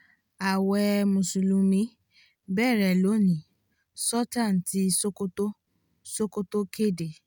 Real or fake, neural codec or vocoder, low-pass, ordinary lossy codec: real; none; none; none